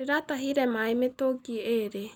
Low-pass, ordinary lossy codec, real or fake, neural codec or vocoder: 19.8 kHz; none; real; none